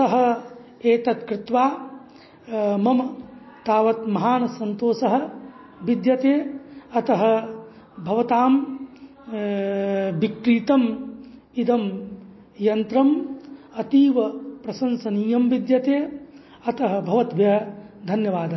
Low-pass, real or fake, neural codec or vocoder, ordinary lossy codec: 7.2 kHz; real; none; MP3, 24 kbps